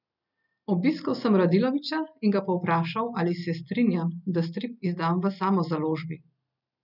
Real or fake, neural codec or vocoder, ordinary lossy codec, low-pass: real; none; none; 5.4 kHz